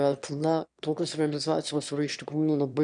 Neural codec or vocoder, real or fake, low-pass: autoencoder, 22.05 kHz, a latent of 192 numbers a frame, VITS, trained on one speaker; fake; 9.9 kHz